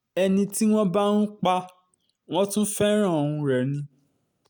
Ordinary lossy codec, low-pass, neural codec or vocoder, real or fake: none; none; none; real